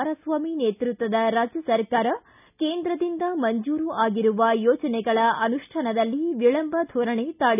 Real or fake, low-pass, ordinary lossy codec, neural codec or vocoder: real; 3.6 kHz; none; none